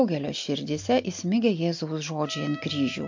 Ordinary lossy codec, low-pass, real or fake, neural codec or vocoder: MP3, 48 kbps; 7.2 kHz; real; none